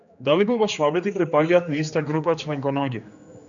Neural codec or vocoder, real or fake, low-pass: codec, 16 kHz, 2 kbps, X-Codec, HuBERT features, trained on general audio; fake; 7.2 kHz